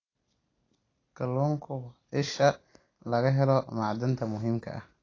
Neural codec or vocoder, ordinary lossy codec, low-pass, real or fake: none; AAC, 32 kbps; 7.2 kHz; real